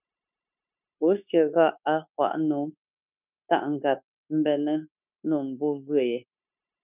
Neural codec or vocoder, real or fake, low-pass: codec, 16 kHz, 0.9 kbps, LongCat-Audio-Codec; fake; 3.6 kHz